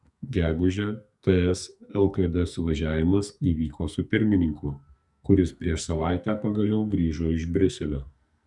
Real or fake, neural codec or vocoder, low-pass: fake; codec, 44.1 kHz, 2.6 kbps, SNAC; 10.8 kHz